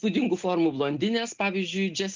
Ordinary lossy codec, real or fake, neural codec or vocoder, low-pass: Opus, 24 kbps; real; none; 7.2 kHz